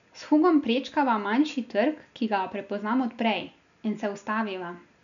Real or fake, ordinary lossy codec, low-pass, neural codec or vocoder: real; none; 7.2 kHz; none